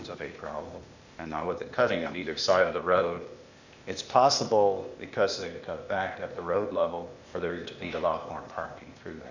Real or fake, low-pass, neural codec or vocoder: fake; 7.2 kHz; codec, 16 kHz, 0.8 kbps, ZipCodec